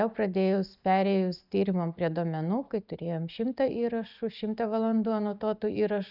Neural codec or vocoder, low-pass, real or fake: none; 5.4 kHz; real